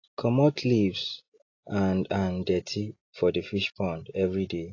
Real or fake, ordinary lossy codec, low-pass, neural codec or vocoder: real; AAC, 32 kbps; 7.2 kHz; none